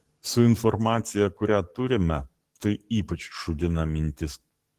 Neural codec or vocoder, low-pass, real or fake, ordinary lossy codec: codec, 44.1 kHz, 7.8 kbps, DAC; 14.4 kHz; fake; Opus, 16 kbps